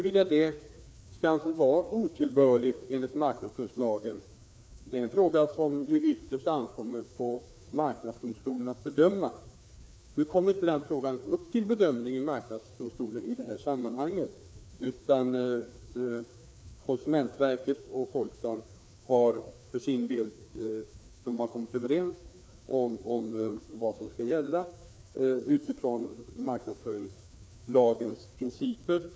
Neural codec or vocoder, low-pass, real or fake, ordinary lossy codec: codec, 16 kHz, 2 kbps, FreqCodec, larger model; none; fake; none